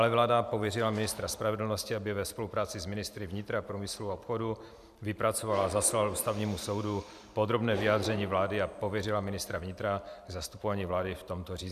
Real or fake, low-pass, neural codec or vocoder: fake; 14.4 kHz; vocoder, 44.1 kHz, 128 mel bands every 512 samples, BigVGAN v2